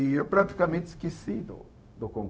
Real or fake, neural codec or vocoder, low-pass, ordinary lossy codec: fake; codec, 16 kHz, 0.4 kbps, LongCat-Audio-Codec; none; none